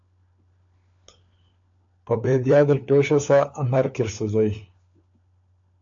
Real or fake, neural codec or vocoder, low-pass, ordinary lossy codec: fake; codec, 16 kHz, 4 kbps, FunCodec, trained on LibriTTS, 50 frames a second; 7.2 kHz; AAC, 64 kbps